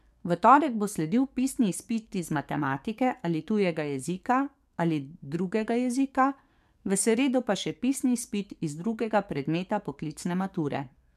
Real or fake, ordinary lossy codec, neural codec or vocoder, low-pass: fake; MP3, 96 kbps; codec, 44.1 kHz, 7.8 kbps, DAC; 14.4 kHz